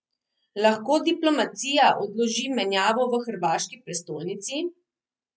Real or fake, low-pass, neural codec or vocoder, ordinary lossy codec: real; none; none; none